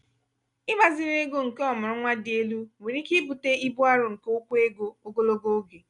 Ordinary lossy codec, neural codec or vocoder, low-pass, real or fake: none; none; 10.8 kHz; real